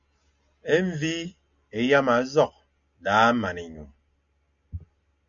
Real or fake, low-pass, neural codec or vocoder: real; 7.2 kHz; none